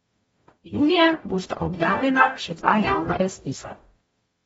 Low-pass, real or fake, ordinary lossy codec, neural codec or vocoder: 19.8 kHz; fake; AAC, 24 kbps; codec, 44.1 kHz, 0.9 kbps, DAC